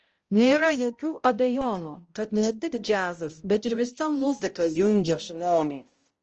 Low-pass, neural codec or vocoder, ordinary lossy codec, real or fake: 7.2 kHz; codec, 16 kHz, 0.5 kbps, X-Codec, HuBERT features, trained on balanced general audio; Opus, 24 kbps; fake